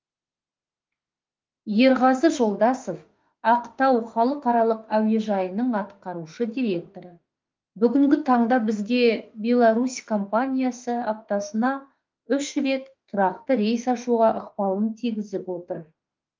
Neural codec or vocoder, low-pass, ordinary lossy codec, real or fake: autoencoder, 48 kHz, 32 numbers a frame, DAC-VAE, trained on Japanese speech; 7.2 kHz; Opus, 24 kbps; fake